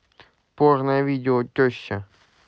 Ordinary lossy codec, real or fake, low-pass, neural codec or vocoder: none; real; none; none